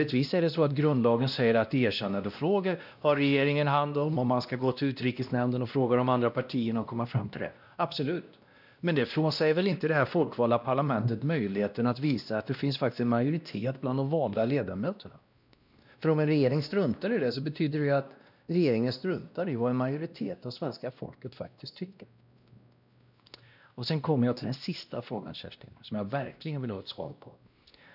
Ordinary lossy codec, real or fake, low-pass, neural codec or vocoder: none; fake; 5.4 kHz; codec, 16 kHz, 1 kbps, X-Codec, WavLM features, trained on Multilingual LibriSpeech